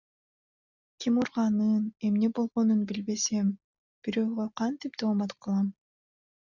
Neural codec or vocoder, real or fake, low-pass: none; real; 7.2 kHz